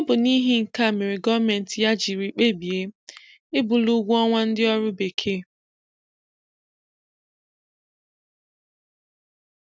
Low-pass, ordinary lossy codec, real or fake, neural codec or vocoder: none; none; real; none